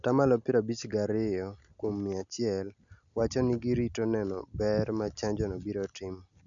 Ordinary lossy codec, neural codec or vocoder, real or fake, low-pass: none; none; real; 7.2 kHz